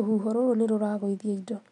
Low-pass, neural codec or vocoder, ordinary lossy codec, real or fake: 10.8 kHz; none; MP3, 64 kbps; real